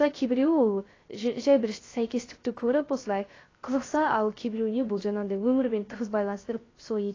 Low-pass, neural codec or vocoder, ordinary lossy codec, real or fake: 7.2 kHz; codec, 16 kHz, 0.3 kbps, FocalCodec; AAC, 32 kbps; fake